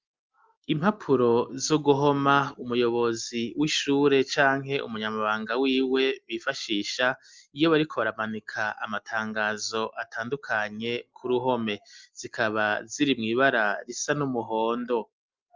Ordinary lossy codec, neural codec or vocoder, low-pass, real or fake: Opus, 32 kbps; none; 7.2 kHz; real